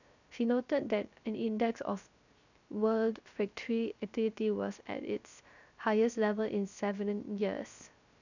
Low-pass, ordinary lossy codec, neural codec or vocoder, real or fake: 7.2 kHz; none; codec, 16 kHz, 0.3 kbps, FocalCodec; fake